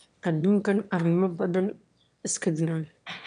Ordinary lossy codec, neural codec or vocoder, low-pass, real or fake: none; autoencoder, 22.05 kHz, a latent of 192 numbers a frame, VITS, trained on one speaker; 9.9 kHz; fake